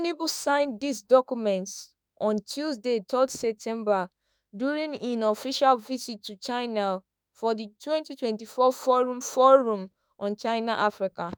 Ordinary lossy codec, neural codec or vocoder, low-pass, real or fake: none; autoencoder, 48 kHz, 32 numbers a frame, DAC-VAE, trained on Japanese speech; none; fake